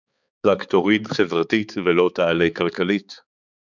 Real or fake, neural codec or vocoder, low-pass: fake; codec, 16 kHz, 4 kbps, X-Codec, HuBERT features, trained on balanced general audio; 7.2 kHz